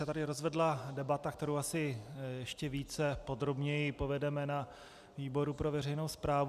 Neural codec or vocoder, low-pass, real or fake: none; 14.4 kHz; real